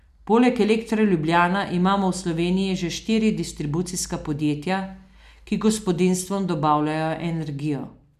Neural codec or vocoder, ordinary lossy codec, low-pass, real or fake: none; none; 14.4 kHz; real